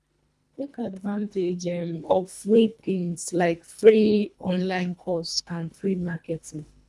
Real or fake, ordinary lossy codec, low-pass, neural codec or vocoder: fake; none; none; codec, 24 kHz, 1.5 kbps, HILCodec